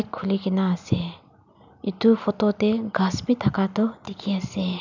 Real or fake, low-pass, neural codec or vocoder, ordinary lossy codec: real; 7.2 kHz; none; none